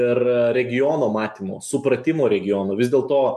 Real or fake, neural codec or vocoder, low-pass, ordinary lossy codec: real; none; 14.4 kHz; MP3, 64 kbps